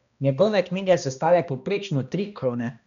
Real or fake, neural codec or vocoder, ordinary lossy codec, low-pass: fake; codec, 16 kHz, 1 kbps, X-Codec, HuBERT features, trained on balanced general audio; none; 7.2 kHz